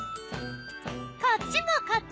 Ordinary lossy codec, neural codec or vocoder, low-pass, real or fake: none; none; none; real